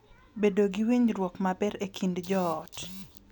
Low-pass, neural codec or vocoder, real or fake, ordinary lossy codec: 19.8 kHz; none; real; none